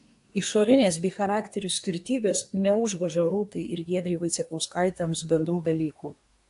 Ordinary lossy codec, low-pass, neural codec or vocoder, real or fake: AAC, 64 kbps; 10.8 kHz; codec, 24 kHz, 1 kbps, SNAC; fake